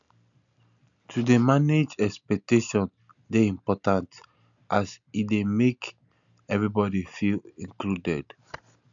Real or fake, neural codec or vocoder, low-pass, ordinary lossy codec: real; none; 7.2 kHz; none